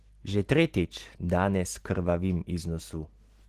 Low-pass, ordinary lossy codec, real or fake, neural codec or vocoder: 14.4 kHz; Opus, 16 kbps; real; none